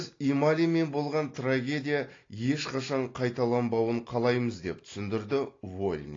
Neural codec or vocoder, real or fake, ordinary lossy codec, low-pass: none; real; AAC, 32 kbps; 7.2 kHz